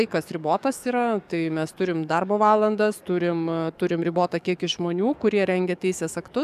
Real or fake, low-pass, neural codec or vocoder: fake; 14.4 kHz; codec, 44.1 kHz, 7.8 kbps, DAC